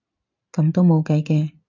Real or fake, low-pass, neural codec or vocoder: real; 7.2 kHz; none